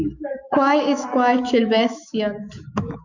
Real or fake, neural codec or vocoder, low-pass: fake; autoencoder, 48 kHz, 128 numbers a frame, DAC-VAE, trained on Japanese speech; 7.2 kHz